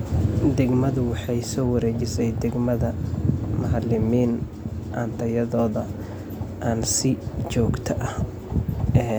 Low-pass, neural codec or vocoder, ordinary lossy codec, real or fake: none; none; none; real